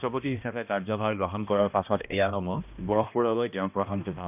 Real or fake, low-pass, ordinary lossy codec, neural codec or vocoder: fake; 3.6 kHz; none; codec, 16 kHz, 1 kbps, X-Codec, HuBERT features, trained on balanced general audio